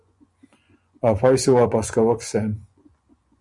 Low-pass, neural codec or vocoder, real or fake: 10.8 kHz; vocoder, 24 kHz, 100 mel bands, Vocos; fake